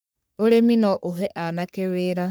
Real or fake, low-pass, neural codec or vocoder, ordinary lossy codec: fake; none; codec, 44.1 kHz, 3.4 kbps, Pupu-Codec; none